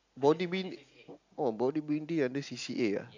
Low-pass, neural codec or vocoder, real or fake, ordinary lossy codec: 7.2 kHz; none; real; AAC, 48 kbps